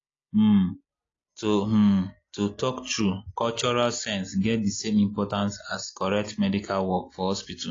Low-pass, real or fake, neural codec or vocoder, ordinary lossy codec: 7.2 kHz; real; none; AAC, 32 kbps